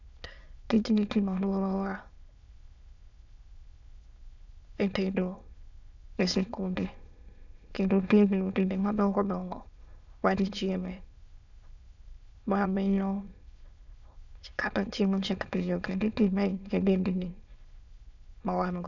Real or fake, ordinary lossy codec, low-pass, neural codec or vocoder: fake; none; 7.2 kHz; autoencoder, 22.05 kHz, a latent of 192 numbers a frame, VITS, trained on many speakers